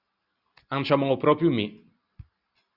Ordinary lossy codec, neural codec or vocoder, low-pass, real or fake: Opus, 64 kbps; none; 5.4 kHz; real